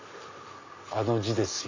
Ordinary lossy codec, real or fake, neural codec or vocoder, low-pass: AAC, 48 kbps; real; none; 7.2 kHz